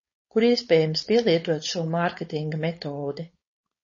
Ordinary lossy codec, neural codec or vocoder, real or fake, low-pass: MP3, 32 kbps; codec, 16 kHz, 4.8 kbps, FACodec; fake; 7.2 kHz